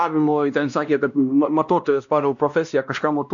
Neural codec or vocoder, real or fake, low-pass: codec, 16 kHz, 1 kbps, X-Codec, WavLM features, trained on Multilingual LibriSpeech; fake; 7.2 kHz